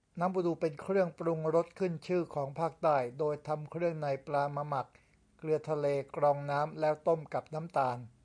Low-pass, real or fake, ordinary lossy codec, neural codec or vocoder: 9.9 kHz; real; MP3, 64 kbps; none